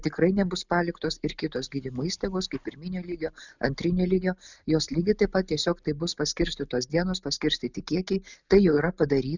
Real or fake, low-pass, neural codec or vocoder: real; 7.2 kHz; none